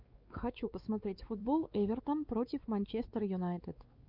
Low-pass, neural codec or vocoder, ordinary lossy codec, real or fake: 5.4 kHz; codec, 16 kHz, 4 kbps, X-Codec, WavLM features, trained on Multilingual LibriSpeech; Opus, 24 kbps; fake